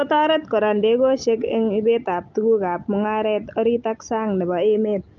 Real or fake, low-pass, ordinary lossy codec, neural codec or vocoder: real; 7.2 kHz; Opus, 24 kbps; none